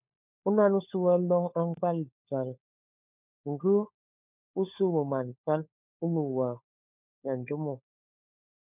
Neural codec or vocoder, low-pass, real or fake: codec, 16 kHz, 4 kbps, FunCodec, trained on LibriTTS, 50 frames a second; 3.6 kHz; fake